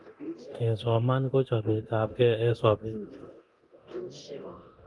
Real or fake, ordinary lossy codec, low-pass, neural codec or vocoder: fake; Opus, 32 kbps; 10.8 kHz; codec, 24 kHz, 0.9 kbps, DualCodec